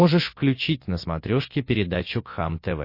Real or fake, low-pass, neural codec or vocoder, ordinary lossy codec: real; 5.4 kHz; none; MP3, 32 kbps